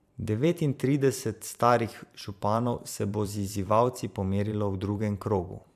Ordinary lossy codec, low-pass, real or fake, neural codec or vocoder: AAC, 96 kbps; 14.4 kHz; fake; vocoder, 44.1 kHz, 128 mel bands every 512 samples, BigVGAN v2